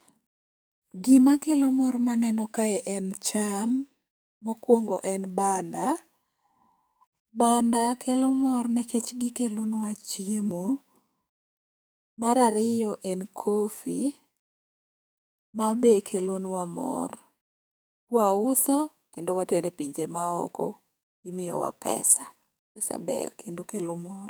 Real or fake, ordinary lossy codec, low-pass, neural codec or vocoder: fake; none; none; codec, 44.1 kHz, 2.6 kbps, SNAC